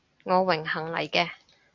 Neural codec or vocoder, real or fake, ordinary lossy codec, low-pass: none; real; MP3, 48 kbps; 7.2 kHz